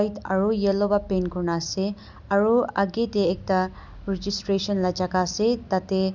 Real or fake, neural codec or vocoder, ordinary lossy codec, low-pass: real; none; none; 7.2 kHz